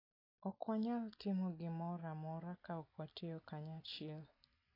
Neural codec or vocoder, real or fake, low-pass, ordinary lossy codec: none; real; 5.4 kHz; AAC, 32 kbps